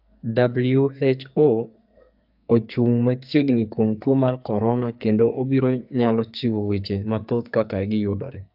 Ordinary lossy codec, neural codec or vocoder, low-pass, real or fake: AAC, 48 kbps; codec, 44.1 kHz, 2.6 kbps, SNAC; 5.4 kHz; fake